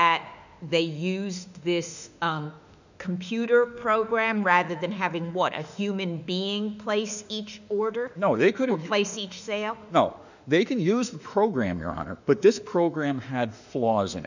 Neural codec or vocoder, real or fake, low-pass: autoencoder, 48 kHz, 32 numbers a frame, DAC-VAE, trained on Japanese speech; fake; 7.2 kHz